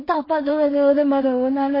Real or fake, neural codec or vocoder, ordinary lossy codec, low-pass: fake; codec, 16 kHz in and 24 kHz out, 0.4 kbps, LongCat-Audio-Codec, two codebook decoder; MP3, 24 kbps; 5.4 kHz